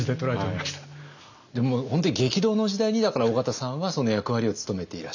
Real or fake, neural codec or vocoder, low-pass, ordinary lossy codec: real; none; 7.2 kHz; none